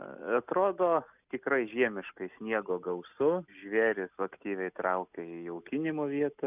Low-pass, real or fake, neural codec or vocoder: 3.6 kHz; real; none